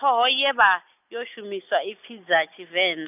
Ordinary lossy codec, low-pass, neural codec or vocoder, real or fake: none; 3.6 kHz; none; real